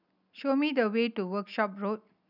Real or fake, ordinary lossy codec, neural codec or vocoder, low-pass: real; none; none; 5.4 kHz